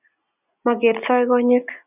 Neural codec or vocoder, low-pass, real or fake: none; 3.6 kHz; real